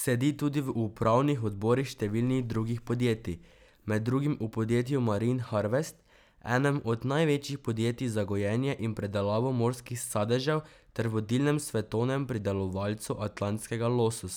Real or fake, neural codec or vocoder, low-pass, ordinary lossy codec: real; none; none; none